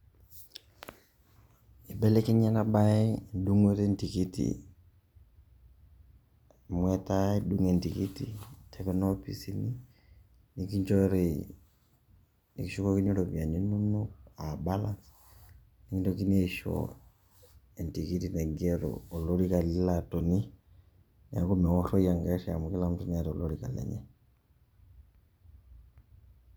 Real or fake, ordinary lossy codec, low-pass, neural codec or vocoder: real; none; none; none